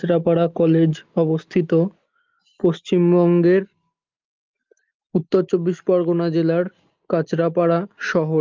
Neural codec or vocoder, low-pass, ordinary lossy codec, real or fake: none; 7.2 kHz; Opus, 24 kbps; real